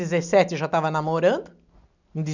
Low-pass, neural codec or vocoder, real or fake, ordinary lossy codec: 7.2 kHz; none; real; none